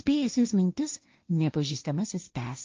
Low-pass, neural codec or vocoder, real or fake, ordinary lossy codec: 7.2 kHz; codec, 16 kHz, 1.1 kbps, Voila-Tokenizer; fake; Opus, 24 kbps